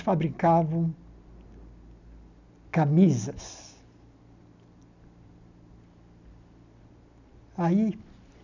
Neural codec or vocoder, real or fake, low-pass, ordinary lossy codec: none; real; 7.2 kHz; none